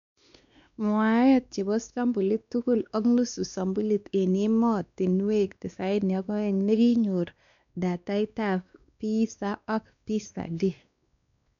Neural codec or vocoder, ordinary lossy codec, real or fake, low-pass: codec, 16 kHz, 2 kbps, X-Codec, WavLM features, trained on Multilingual LibriSpeech; none; fake; 7.2 kHz